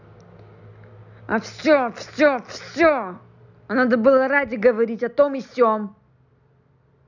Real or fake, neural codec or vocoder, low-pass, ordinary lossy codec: real; none; 7.2 kHz; none